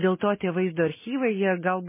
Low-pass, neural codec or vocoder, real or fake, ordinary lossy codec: 3.6 kHz; none; real; MP3, 16 kbps